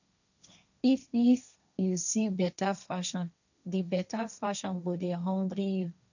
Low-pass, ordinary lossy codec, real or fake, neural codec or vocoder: 7.2 kHz; none; fake; codec, 16 kHz, 1.1 kbps, Voila-Tokenizer